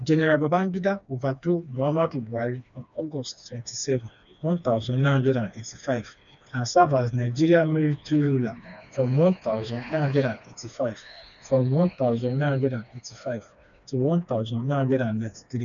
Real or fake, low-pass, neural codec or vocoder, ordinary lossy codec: fake; 7.2 kHz; codec, 16 kHz, 2 kbps, FreqCodec, smaller model; none